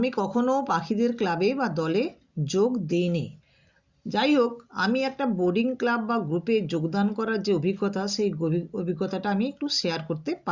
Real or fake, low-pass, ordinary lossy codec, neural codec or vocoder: real; 7.2 kHz; Opus, 64 kbps; none